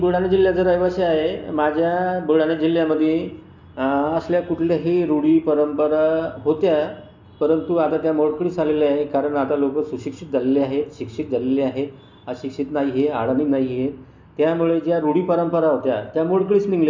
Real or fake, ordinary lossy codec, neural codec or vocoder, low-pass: real; MP3, 64 kbps; none; 7.2 kHz